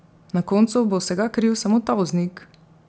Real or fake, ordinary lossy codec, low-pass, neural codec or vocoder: real; none; none; none